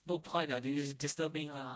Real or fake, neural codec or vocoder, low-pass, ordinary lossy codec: fake; codec, 16 kHz, 1 kbps, FreqCodec, smaller model; none; none